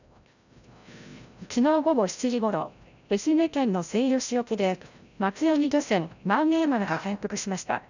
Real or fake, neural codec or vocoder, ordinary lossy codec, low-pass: fake; codec, 16 kHz, 0.5 kbps, FreqCodec, larger model; none; 7.2 kHz